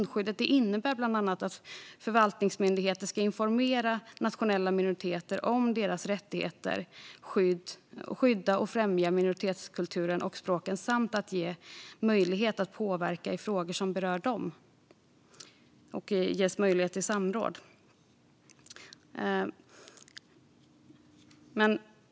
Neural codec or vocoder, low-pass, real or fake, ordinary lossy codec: none; none; real; none